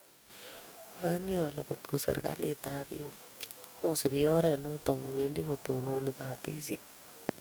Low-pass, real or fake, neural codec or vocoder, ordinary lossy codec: none; fake; codec, 44.1 kHz, 2.6 kbps, DAC; none